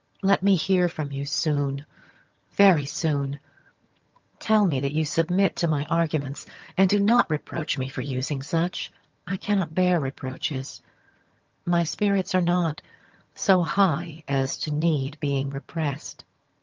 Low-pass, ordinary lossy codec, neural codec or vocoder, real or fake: 7.2 kHz; Opus, 32 kbps; vocoder, 22.05 kHz, 80 mel bands, HiFi-GAN; fake